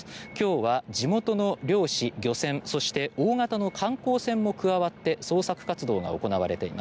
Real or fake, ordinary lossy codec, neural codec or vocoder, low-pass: real; none; none; none